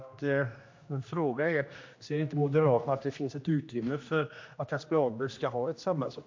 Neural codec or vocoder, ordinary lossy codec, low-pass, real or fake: codec, 16 kHz, 1 kbps, X-Codec, HuBERT features, trained on general audio; MP3, 48 kbps; 7.2 kHz; fake